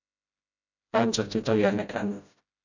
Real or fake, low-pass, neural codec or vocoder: fake; 7.2 kHz; codec, 16 kHz, 0.5 kbps, FreqCodec, smaller model